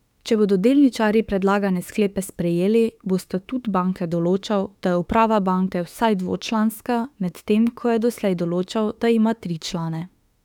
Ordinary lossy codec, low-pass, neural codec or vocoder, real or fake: none; 19.8 kHz; autoencoder, 48 kHz, 32 numbers a frame, DAC-VAE, trained on Japanese speech; fake